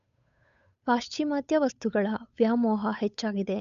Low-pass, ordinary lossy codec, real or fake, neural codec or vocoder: 7.2 kHz; none; fake; codec, 16 kHz, 8 kbps, FunCodec, trained on Chinese and English, 25 frames a second